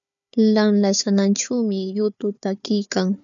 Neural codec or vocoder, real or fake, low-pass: codec, 16 kHz, 4 kbps, FunCodec, trained on Chinese and English, 50 frames a second; fake; 7.2 kHz